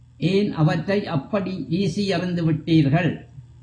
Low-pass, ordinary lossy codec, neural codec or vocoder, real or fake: 9.9 kHz; AAC, 32 kbps; none; real